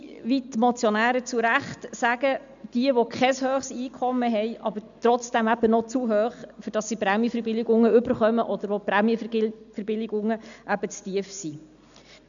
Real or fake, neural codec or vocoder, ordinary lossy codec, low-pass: real; none; none; 7.2 kHz